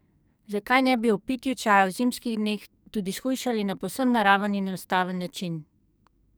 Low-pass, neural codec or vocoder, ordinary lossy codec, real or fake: none; codec, 44.1 kHz, 2.6 kbps, SNAC; none; fake